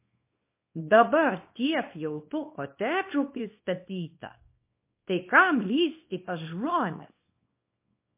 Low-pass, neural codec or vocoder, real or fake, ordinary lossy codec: 3.6 kHz; codec, 24 kHz, 0.9 kbps, WavTokenizer, small release; fake; MP3, 24 kbps